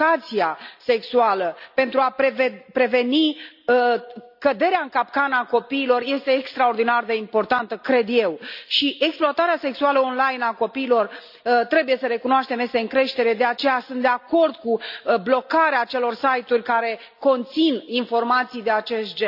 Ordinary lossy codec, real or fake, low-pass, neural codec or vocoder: none; real; 5.4 kHz; none